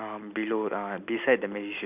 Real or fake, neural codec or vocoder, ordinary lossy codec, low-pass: real; none; none; 3.6 kHz